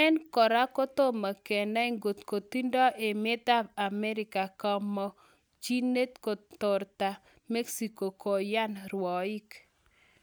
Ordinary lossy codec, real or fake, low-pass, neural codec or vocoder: none; real; none; none